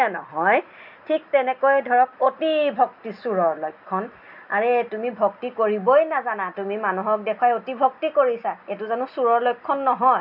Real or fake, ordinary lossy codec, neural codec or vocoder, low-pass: real; none; none; 5.4 kHz